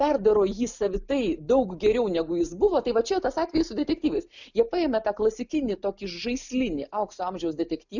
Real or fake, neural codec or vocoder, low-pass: real; none; 7.2 kHz